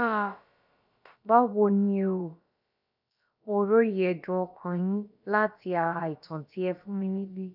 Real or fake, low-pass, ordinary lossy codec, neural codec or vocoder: fake; 5.4 kHz; none; codec, 16 kHz, about 1 kbps, DyCAST, with the encoder's durations